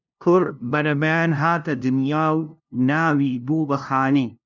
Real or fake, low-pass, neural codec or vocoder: fake; 7.2 kHz; codec, 16 kHz, 0.5 kbps, FunCodec, trained on LibriTTS, 25 frames a second